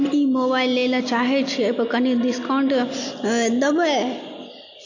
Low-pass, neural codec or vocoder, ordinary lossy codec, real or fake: 7.2 kHz; none; AAC, 48 kbps; real